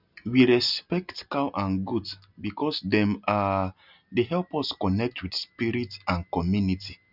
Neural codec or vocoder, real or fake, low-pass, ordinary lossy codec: none; real; 5.4 kHz; none